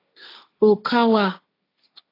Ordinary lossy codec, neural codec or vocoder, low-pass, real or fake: AAC, 24 kbps; codec, 16 kHz, 1.1 kbps, Voila-Tokenizer; 5.4 kHz; fake